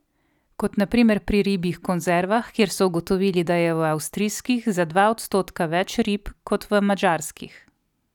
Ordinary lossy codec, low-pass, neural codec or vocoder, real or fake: none; 19.8 kHz; none; real